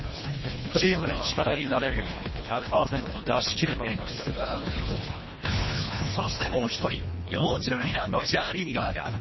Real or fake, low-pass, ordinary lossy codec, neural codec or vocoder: fake; 7.2 kHz; MP3, 24 kbps; codec, 24 kHz, 1.5 kbps, HILCodec